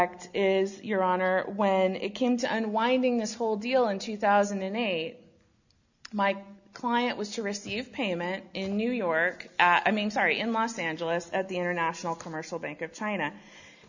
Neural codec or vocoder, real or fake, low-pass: none; real; 7.2 kHz